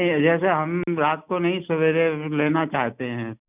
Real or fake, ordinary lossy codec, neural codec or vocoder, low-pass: real; none; none; 3.6 kHz